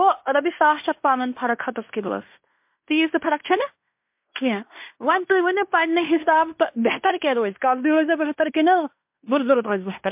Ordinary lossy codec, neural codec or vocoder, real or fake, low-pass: MP3, 32 kbps; codec, 16 kHz in and 24 kHz out, 0.9 kbps, LongCat-Audio-Codec, fine tuned four codebook decoder; fake; 3.6 kHz